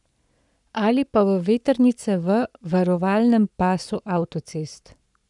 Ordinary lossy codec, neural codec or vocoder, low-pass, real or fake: none; codec, 44.1 kHz, 7.8 kbps, Pupu-Codec; 10.8 kHz; fake